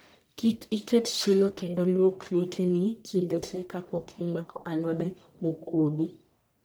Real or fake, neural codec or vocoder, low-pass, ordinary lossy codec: fake; codec, 44.1 kHz, 1.7 kbps, Pupu-Codec; none; none